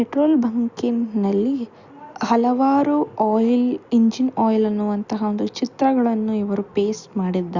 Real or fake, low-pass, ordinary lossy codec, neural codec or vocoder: real; 7.2 kHz; Opus, 64 kbps; none